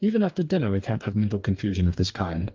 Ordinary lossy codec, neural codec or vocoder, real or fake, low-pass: Opus, 32 kbps; codec, 44.1 kHz, 2.6 kbps, DAC; fake; 7.2 kHz